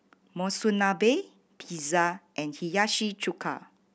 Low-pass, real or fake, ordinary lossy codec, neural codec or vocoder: none; real; none; none